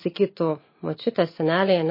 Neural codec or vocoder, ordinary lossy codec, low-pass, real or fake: none; MP3, 24 kbps; 5.4 kHz; real